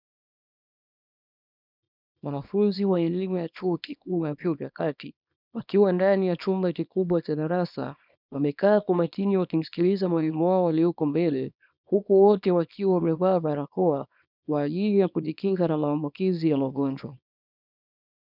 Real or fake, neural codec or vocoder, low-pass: fake; codec, 24 kHz, 0.9 kbps, WavTokenizer, small release; 5.4 kHz